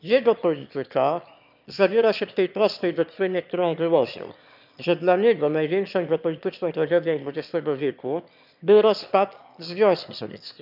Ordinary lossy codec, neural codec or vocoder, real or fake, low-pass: none; autoencoder, 22.05 kHz, a latent of 192 numbers a frame, VITS, trained on one speaker; fake; 5.4 kHz